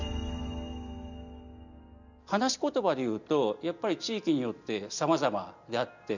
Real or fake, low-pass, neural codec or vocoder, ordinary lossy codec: real; 7.2 kHz; none; none